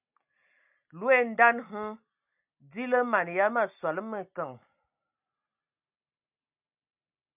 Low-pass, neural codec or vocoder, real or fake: 3.6 kHz; none; real